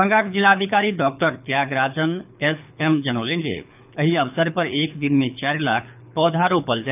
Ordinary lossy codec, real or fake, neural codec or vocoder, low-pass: none; fake; codec, 24 kHz, 6 kbps, HILCodec; 3.6 kHz